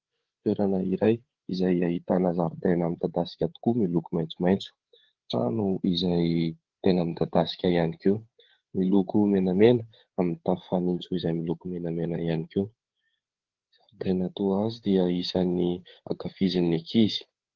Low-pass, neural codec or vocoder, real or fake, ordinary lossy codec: 7.2 kHz; codec, 16 kHz, 8 kbps, FreqCodec, larger model; fake; Opus, 16 kbps